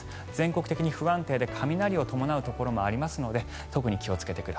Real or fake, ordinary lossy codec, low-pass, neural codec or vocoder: real; none; none; none